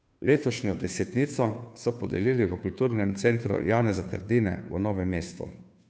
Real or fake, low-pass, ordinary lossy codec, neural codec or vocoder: fake; none; none; codec, 16 kHz, 2 kbps, FunCodec, trained on Chinese and English, 25 frames a second